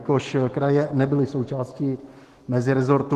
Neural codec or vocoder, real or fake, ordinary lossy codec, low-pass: autoencoder, 48 kHz, 128 numbers a frame, DAC-VAE, trained on Japanese speech; fake; Opus, 16 kbps; 14.4 kHz